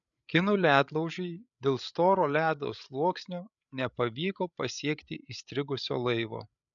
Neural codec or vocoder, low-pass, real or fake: codec, 16 kHz, 8 kbps, FreqCodec, larger model; 7.2 kHz; fake